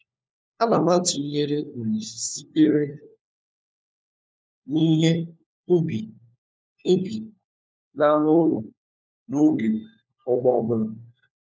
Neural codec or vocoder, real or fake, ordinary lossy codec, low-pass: codec, 16 kHz, 4 kbps, FunCodec, trained on LibriTTS, 50 frames a second; fake; none; none